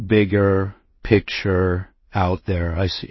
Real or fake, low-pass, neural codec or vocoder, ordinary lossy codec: fake; 7.2 kHz; codec, 16 kHz in and 24 kHz out, 0.4 kbps, LongCat-Audio-Codec, two codebook decoder; MP3, 24 kbps